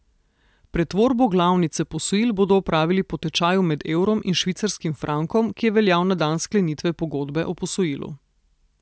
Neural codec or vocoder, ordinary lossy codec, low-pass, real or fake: none; none; none; real